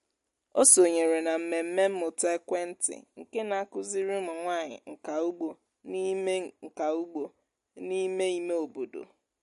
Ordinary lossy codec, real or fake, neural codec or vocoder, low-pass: MP3, 48 kbps; real; none; 14.4 kHz